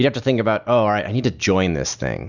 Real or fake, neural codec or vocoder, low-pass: real; none; 7.2 kHz